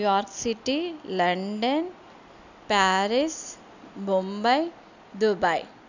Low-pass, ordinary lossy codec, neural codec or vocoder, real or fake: 7.2 kHz; none; none; real